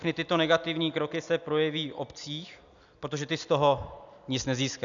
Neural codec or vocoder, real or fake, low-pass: none; real; 7.2 kHz